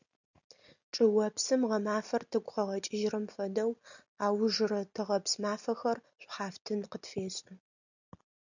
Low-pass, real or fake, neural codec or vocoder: 7.2 kHz; real; none